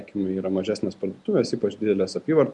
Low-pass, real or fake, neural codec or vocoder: 10.8 kHz; real; none